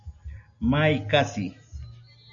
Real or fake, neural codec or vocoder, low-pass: real; none; 7.2 kHz